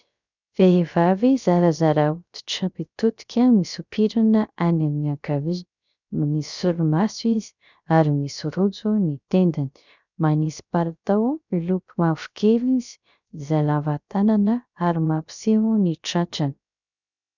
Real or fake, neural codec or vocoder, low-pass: fake; codec, 16 kHz, 0.3 kbps, FocalCodec; 7.2 kHz